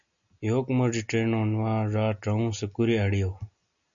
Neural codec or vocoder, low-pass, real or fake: none; 7.2 kHz; real